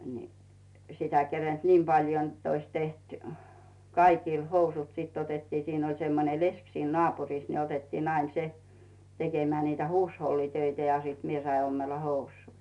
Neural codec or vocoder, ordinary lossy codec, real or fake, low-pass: none; none; real; 10.8 kHz